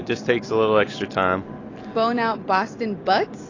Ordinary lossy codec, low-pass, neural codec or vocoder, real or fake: AAC, 32 kbps; 7.2 kHz; none; real